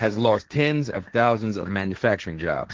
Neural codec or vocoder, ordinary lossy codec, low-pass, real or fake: codec, 16 kHz, 1.1 kbps, Voila-Tokenizer; Opus, 16 kbps; 7.2 kHz; fake